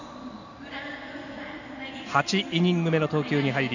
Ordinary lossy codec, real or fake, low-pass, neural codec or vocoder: none; real; 7.2 kHz; none